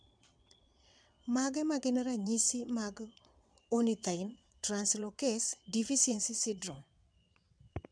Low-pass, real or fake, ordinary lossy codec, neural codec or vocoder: 9.9 kHz; fake; none; vocoder, 22.05 kHz, 80 mel bands, WaveNeXt